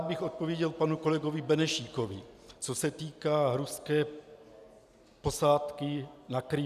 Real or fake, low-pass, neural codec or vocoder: real; 14.4 kHz; none